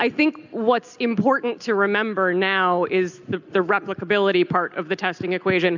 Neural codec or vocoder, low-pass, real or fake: none; 7.2 kHz; real